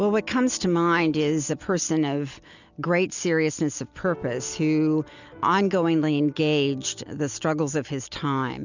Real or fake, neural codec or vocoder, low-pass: real; none; 7.2 kHz